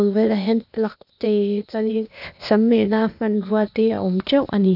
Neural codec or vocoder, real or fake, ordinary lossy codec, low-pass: codec, 16 kHz, 0.8 kbps, ZipCodec; fake; none; 5.4 kHz